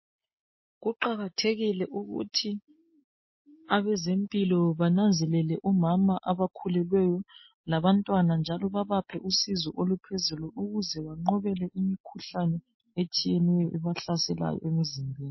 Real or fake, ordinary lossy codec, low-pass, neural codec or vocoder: real; MP3, 24 kbps; 7.2 kHz; none